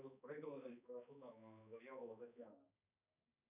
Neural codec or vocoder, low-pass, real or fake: codec, 16 kHz, 2 kbps, X-Codec, HuBERT features, trained on balanced general audio; 3.6 kHz; fake